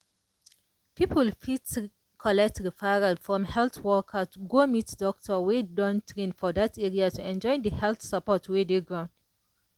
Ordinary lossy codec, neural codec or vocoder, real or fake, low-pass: Opus, 24 kbps; none; real; 19.8 kHz